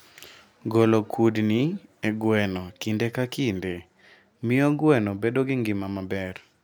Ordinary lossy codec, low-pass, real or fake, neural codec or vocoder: none; none; real; none